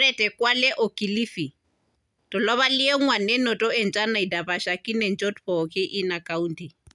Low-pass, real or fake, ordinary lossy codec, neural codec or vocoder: 10.8 kHz; real; none; none